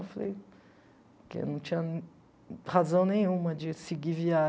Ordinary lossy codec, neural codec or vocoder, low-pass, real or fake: none; none; none; real